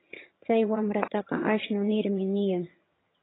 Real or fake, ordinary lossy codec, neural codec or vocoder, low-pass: fake; AAC, 16 kbps; vocoder, 22.05 kHz, 80 mel bands, HiFi-GAN; 7.2 kHz